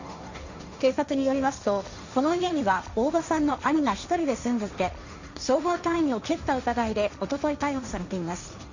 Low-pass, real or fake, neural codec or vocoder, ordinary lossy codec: 7.2 kHz; fake; codec, 16 kHz, 1.1 kbps, Voila-Tokenizer; Opus, 64 kbps